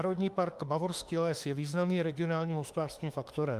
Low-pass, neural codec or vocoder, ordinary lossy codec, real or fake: 14.4 kHz; autoencoder, 48 kHz, 32 numbers a frame, DAC-VAE, trained on Japanese speech; Opus, 32 kbps; fake